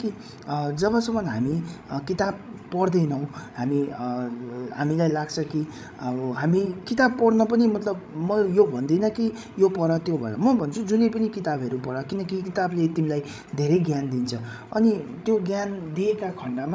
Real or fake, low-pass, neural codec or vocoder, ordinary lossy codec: fake; none; codec, 16 kHz, 16 kbps, FreqCodec, larger model; none